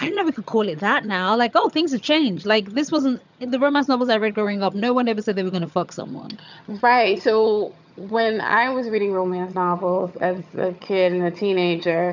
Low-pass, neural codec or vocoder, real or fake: 7.2 kHz; vocoder, 22.05 kHz, 80 mel bands, HiFi-GAN; fake